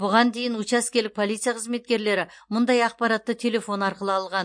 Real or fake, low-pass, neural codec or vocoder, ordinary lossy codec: real; 9.9 kHz; none; MP3, 48 kbps